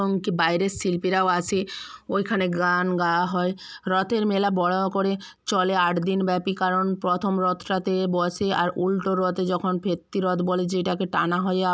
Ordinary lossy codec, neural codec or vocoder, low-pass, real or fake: none; none; none; real